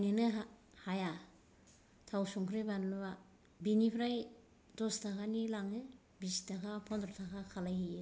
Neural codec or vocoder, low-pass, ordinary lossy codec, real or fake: none; none; none; real